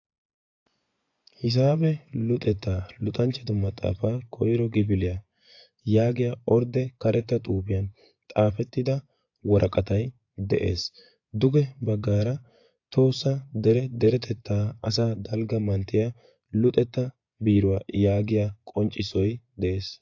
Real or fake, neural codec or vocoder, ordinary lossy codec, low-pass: fake; vocoder, 24 kHz, 100 mel bands, Vocos; AAC, 48 kbps; 7.2 kHz